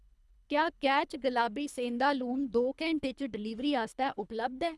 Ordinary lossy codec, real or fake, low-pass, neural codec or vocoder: none; fake; none; codec, 24 kHz, 3 kbps, HILCodec